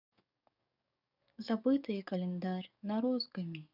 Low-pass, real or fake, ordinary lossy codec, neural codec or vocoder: 5.4 kHz; fake; none; codec, 44.1 kHz, 7.8 kbps, DAC